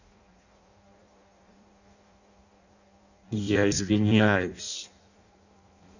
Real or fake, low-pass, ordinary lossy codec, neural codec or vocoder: fake; 7.2 kHz; none; codec, 16 kHz in and 24 kHz out, 0.6 kbps, FireRedTTS-2 codec